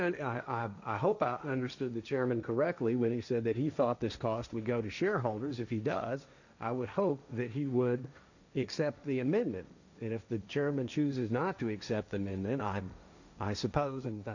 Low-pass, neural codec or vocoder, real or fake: 7.2 kHz; codec, 16 kHz, 1.1 kbps, Voila-Tokenizer; fake